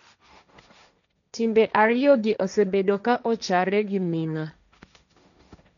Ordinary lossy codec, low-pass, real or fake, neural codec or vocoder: none; 7.2 kHz; fake; codec, 16 kHz, 1.1 kbps, Voila-Tokenizer